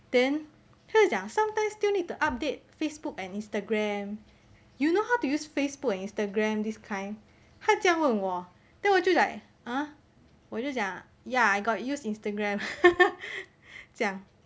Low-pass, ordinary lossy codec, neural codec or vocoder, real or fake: none; none; none; real